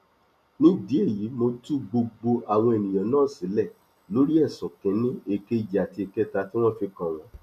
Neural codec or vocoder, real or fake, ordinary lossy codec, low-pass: none; real; none; 14.4 kHz